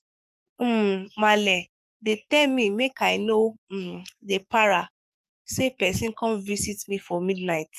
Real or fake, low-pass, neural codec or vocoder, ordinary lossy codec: fake; 14.4 kHz; codec, 44.1 kHz, 7.8 kbps, DAC; none